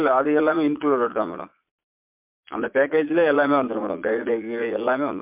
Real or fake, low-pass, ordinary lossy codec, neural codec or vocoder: fake; 3.6 kHz; MP3, 32 kbps; vocoder, 22.05 kHz, 80 mel bands, Vocos